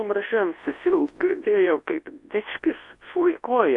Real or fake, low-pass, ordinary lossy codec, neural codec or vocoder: fake; 10.8 kHz; AAC, 48 kbps; codec, 24 kHz, 0.9 kbps, WavTokenizer, large speech release